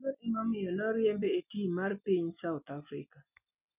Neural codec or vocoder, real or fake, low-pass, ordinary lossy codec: none; real; 3.6 kHz; none